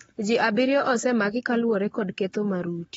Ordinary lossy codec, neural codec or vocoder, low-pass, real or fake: AAC, 24 kbps; codec, 44.1 kHz, 7.8 kbps, Pupu-Codec; 19.8 kHz; fake